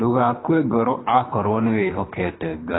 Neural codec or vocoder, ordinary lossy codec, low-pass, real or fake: codec, 24 kHz, 3 kbps, HILCodec; AAC, 16 kbps; 7.2 kHz; fake